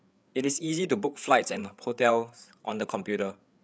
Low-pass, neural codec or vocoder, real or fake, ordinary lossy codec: none; codec, 16 kHz, 8 kbps, FreqCodec, larger model; fake; none